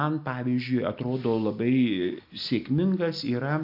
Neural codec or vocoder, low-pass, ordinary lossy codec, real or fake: none; 5.4 kHz; AAC, 48 kbps; real